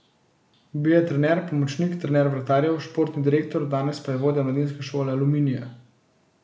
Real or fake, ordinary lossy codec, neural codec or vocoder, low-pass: real; none; none; none